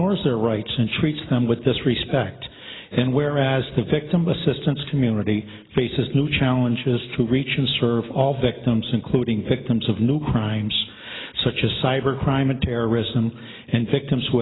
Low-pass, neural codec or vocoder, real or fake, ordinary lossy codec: 7.2 kHz; none; real; AAC, 16 kbps